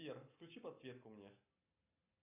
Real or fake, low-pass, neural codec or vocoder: real; 3.6 kHz; none